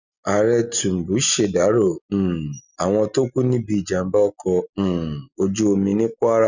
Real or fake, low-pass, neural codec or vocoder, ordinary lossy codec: real; 7.2 kHz; none; none